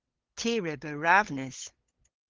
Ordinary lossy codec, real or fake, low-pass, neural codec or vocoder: Opus, 16 kbps; fake; 7.2 kHz; codec, 16 kHz, 8 kbps, FunCodec, trained on LibriTTS, 25 frames a second